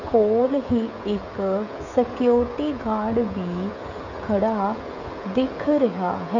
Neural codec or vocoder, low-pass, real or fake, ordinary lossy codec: codec, 16 kHz, 16 kbps, FreqCodec, smaller model; 7.2 kHz; fake; none